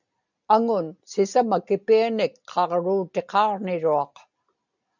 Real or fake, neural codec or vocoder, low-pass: real; none; 7.2 kHz